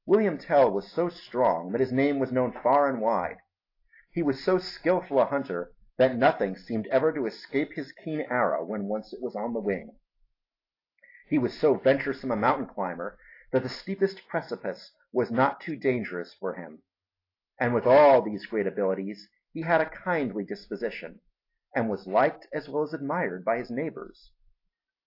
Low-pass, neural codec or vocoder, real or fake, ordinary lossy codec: 5.4 kHz; none; real; AAC, 32 kbps